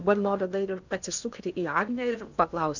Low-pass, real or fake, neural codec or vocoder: 7.2 kHz; fake; codec, 16 kHz in and 24 kHz out, 0.8 kbps, FocalCodec, streaming, 65536 codes